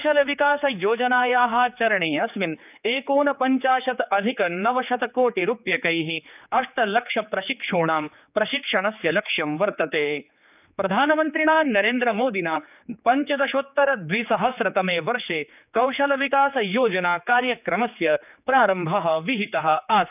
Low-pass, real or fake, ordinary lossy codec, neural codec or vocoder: 3.6 kHz; fake; none; codec, 16 kHz, 4 kbps, X-Codec, HuBERT features, trained on general audio